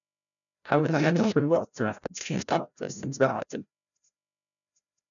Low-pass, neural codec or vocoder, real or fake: 7.2 kHz; codec, 16 kHz, 0.5 kbps, FreqCodec, larger model; fake